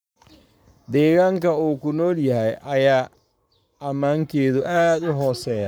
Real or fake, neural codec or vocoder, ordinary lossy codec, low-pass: fake; codec, 44.1 kHz, 7.8 kbps, Pupu-Codec; none; none